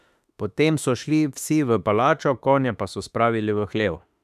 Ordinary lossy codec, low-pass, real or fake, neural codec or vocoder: none; 14.4 kHz; fake; autoencoder, 48 kHz, 32 numbers a frame, DAC-VAE, trained on Japanese speech